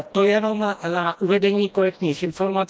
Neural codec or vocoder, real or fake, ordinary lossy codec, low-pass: codec, 16 kHz, 1 kbps, FreqCodec, smaller model; fake; none; none